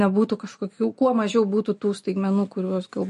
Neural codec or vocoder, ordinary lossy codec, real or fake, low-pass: none; MP3, 48 kbps; real; 14.4 kHz